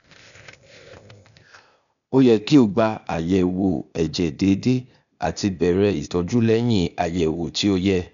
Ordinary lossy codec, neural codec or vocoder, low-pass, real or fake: none; codec, 16 kHz, 0.8 kbps, ZipCodec; 7.2 kHz; fake